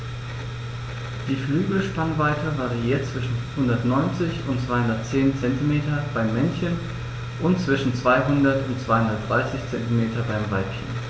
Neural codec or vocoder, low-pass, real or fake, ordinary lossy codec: none; none; real; none